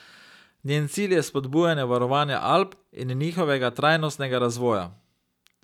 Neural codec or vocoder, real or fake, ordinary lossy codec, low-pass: none; real; none; 19.8 kHz